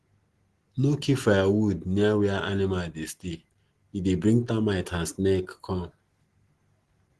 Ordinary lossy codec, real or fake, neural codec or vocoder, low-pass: Opus, 16 kbps; real; none; 10.8 kHz